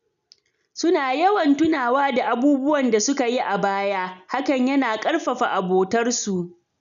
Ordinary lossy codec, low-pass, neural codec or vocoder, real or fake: none; 7.2 kHz; none; real